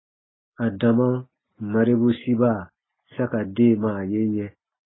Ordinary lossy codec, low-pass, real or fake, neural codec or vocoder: AAC, 16 kbps; 7.2 kHz; real; none